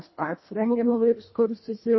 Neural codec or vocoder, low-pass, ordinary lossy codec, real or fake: codec, 24 kHz, 1.5 kbps, HILCodec; 7.2 kHz; MP3, 24 kbps; fake